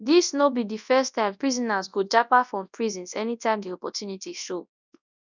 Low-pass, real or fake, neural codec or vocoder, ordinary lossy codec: 7.2 kHz; fake; codec, 24 kHz, 0.9 kbps, WavTokenizer, large speech release; none